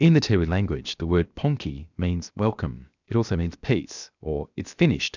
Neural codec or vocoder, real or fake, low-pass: codec, 16 kHz, 0.7 kbps, FocalCodec; fake; 7.2 kHz